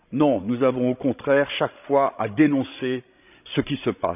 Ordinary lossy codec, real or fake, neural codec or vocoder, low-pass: none; fake; codec, 16 kHz, 16 kbps, FreqCodec, larger model; 3.6 kHz